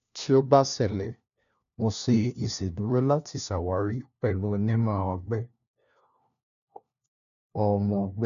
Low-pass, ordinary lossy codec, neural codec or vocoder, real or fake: 7.2 kHz; none; codec, 16 kHz, 1 kbps, FunCodec, trained on LibriTTS, 50 frames a second; fake